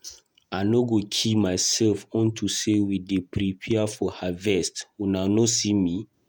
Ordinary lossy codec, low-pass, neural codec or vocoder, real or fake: none; 19.8 kHz; vocoder, 48 kHz, 128 mel bands, Vocos; fake